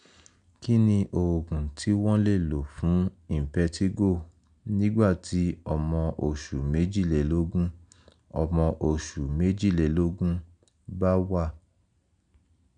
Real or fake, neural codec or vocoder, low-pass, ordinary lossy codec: real; none; 9.9 kHz; none